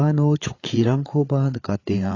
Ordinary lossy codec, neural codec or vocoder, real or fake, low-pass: AAC, 32 kbps; codec, 16 kHz, 8 kbps, FreqCodec, larger model; fake; 7.2 kHz